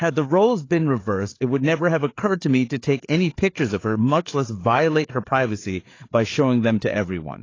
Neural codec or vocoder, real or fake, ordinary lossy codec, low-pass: codec, 16 kHz, 4 kbps, FreqCodec, larger model; fake; AAC, 32 kbps; 7.2 kHz